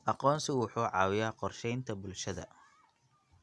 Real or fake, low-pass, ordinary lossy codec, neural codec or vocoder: real; none; none; none